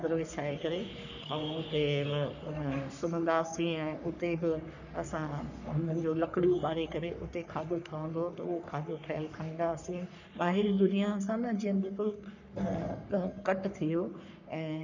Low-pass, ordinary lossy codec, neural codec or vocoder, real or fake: 7.2 kHz; none; codec, 44.1 kHz, 3.4 kbps, Pupu-Codec; fake